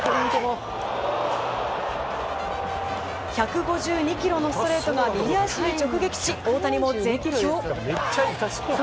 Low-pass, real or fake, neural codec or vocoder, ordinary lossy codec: none; real; none; none